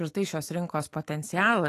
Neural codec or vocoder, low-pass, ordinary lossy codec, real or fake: autoencoder, 48 kHz, 128 numbers a frame, DAC-VAE, trained on Japanese speech; 14.4 kHz; AAC, 48 kbps; fake